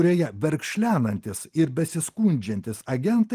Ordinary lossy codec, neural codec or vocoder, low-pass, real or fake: Opus, 24 kbps; none; 14.4 kHz; real